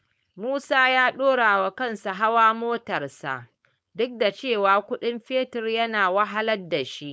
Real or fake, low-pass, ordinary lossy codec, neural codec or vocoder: fake; none; none; codec, 16 kHz, 4.8 kbps, FACodec